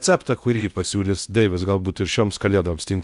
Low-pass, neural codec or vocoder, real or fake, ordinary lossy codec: 10.8 kHz; codec, 16 kHz in and 24 kHz out, 0.8 kbps, FocalCodec, streaming, 65536 codes; fake; Opus, 64 kbps